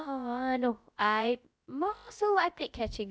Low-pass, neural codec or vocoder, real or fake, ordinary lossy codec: none; codec, 16 kHz, about 1 kbps, DyCAST, with the encoder's durations; fake; none